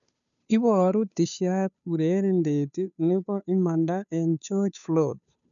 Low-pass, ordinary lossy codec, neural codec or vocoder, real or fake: 7.2 kHz; none; codec, 16 kHz, 2 kbps, FunCodec, trained on Chinese and English, 25 frames a second; fake